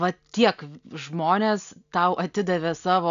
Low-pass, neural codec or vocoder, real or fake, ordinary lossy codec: 7.2 kHz; none; real; AAC, 96 kbps